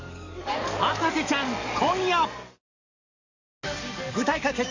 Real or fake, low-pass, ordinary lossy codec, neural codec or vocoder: fake; 7.2 kHz; Opus, 64 kbps; codec, 44.1 kHz, 7.8 kbps, DAC